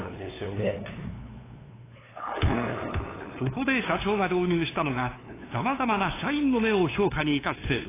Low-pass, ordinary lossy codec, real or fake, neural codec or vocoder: 3.6 kHz; AAC, 16 kbps; fake; codec, 16 kHz, 2 kbps, FunCodec, trained on LibriTTS, 25 frames a second